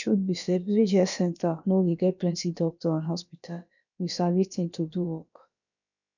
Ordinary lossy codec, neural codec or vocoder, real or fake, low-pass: none; codec, 16 kHz, about 1 kbps, DyCAST, with the encoder's durations; fake; 7.2 kHz